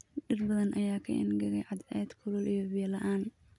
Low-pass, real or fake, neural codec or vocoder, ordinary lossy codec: 10.8 kHz; real; none; none